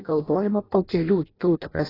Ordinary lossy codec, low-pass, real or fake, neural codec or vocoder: AAC, 24 kbps; 5.4 kHz; fake; codec, 16 kHz in and 24 kHz out, 0.6 kbps, FireRedTTS-2 codec